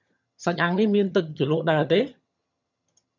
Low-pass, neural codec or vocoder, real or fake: 7.2 kHz; vocoder, 22.05 kHz, 80 mel bands, HiFi-GAN; fake